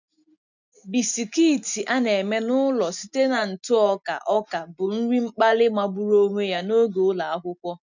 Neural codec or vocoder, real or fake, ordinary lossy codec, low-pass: none; real; none; 7.2 kHz